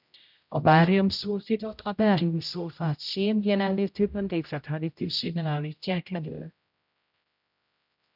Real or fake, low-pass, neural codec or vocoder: fake; 5.4 kHz; codec, 16 kHz, 0.5 kbps, X-Codec, HuBERT features, trained on general audio